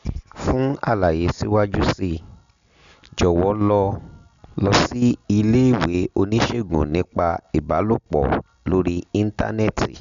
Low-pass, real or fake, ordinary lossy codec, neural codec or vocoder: 7.2 kHz; real; Opus, 64 kbps; none